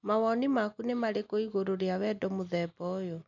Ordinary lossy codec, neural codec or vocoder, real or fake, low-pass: none; none; real; 7.2 kHz